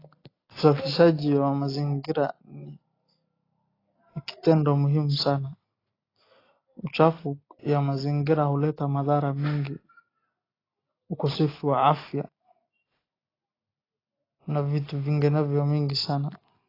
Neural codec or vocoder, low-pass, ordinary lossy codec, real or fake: none; 5.4 kHz; AAC, 24 kbps; real